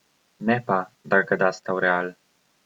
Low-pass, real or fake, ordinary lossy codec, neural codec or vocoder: 19.8 kHz; real; Opus, 64 kbps; none